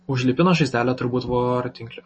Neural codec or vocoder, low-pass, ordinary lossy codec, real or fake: none; 9.9 kHz; MP3, 32 kbps; real